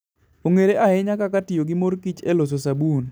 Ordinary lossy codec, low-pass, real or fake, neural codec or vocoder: none; none; real; none